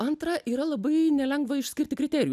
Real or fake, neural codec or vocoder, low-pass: real; none; 14.4 kHz